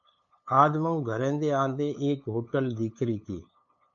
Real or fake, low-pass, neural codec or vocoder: fake; 7.2 kHz; codec, 16 kHz, 8 kbps, FunCodec, trained on LibriTTS, 25 frames a second